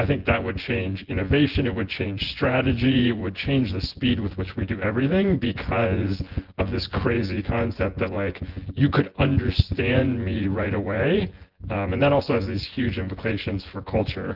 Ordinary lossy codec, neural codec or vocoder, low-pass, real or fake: Opus, 16 kbps; vocoder, 24 kHz, 100 mel bands, Vocos; 5.4 kHz; fake